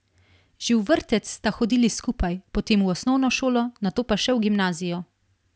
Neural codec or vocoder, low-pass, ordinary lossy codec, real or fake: none; none; none; real